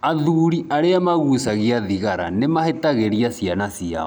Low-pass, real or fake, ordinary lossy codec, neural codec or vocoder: none; real; none; none